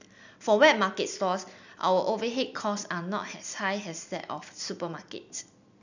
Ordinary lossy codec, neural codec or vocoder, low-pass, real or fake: none; none; 7.2 kHz; real